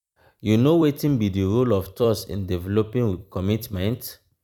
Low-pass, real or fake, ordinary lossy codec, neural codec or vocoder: 19.8 kHz; fake; none; vocoder, 44.1 kHz, 128 mel bands every 256 samples, BigVGAN v2